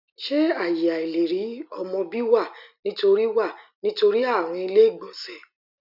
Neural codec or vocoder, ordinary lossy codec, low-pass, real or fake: none; none; 5.4 kHz; real